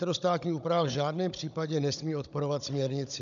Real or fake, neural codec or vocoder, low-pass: fake; codec, 16 kHz, 16 kbps, FunCodec, trained on Chinese and English, 50 frames a second; 7.2 kHz